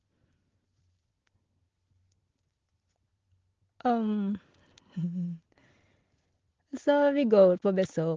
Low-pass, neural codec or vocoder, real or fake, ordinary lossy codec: 7.2 kHz; none; real; Opus, 16 kbps